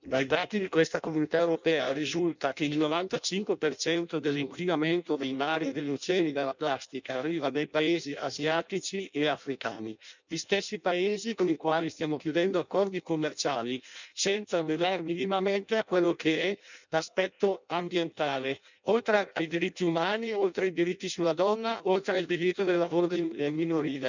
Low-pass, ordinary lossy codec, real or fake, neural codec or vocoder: 7.2 kHz; none; fake; codec, 16 kHz in and 24 kHz out, 0.6 kbps, FireRedTTS-2 codec